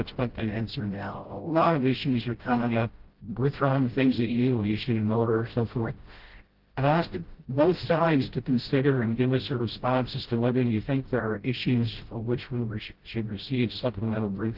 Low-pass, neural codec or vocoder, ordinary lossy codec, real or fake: 5.4 kHz; codec, 16 kHz, 0.5 kbps, FreqCodec, smaller model; Opus, 16 kbps; fake